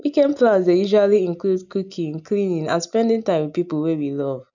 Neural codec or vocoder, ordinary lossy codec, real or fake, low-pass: none; none; real; 7.2 kHz